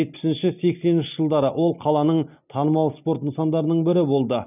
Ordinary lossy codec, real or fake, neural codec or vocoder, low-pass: none; real; none; 3.6 kHz